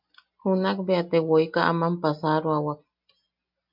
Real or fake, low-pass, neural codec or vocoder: real; 5.4 kHz; none